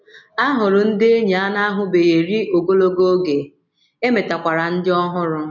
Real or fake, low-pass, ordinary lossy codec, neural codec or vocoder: real; 7.2 kHz; none; none